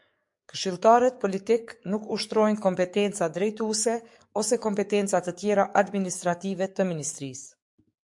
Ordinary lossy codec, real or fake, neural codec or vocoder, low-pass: MP3, 64 kbps; fake; codec, 44.1 kHz, 7.8 kbps, DAC; 10.8 kHz